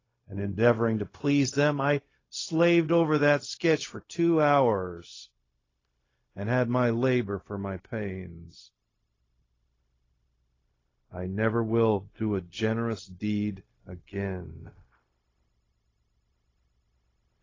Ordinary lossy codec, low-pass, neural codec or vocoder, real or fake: AAC, 32 kbps; 7.2 kHz; codec, 16 kHz, 0.4 kbps, LongCat-Audio-Codec; fake